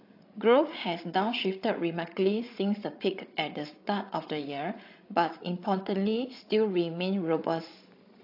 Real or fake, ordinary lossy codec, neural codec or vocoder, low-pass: fake; AAC, 32 kbps; codec, 16 kHz, 16 kbps, FreqCodec, larger model; 5.4 kHz